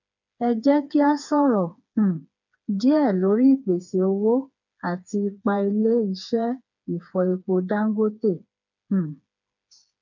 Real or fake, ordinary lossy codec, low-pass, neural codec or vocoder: fake; none; 7.2 kHz; codec, 16 kHz, 4 kbps, FreqCodec, smaller model